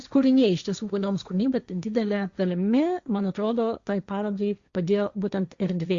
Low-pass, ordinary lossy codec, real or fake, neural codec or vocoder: 7.2 kHz; Opus, 64 kbps; fake; codec, 16 kHz, 1.1 kbps, Voila-Tokenizer